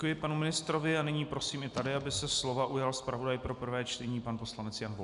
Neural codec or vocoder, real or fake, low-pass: none; real; 10.8 kHz